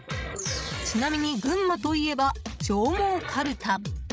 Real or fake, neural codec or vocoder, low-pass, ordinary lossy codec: fake; codec, 16 kHz, 16 kbps, FreqCodec, larger model; none; none